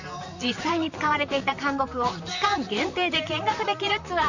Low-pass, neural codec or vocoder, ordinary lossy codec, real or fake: 7.2 kHz; vocoder, 44.1 kHz, 128 mel bands, Pupu-Vocoder; none; fake